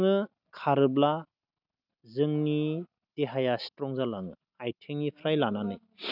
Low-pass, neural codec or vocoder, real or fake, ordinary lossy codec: 5.4 kHz; none; real; none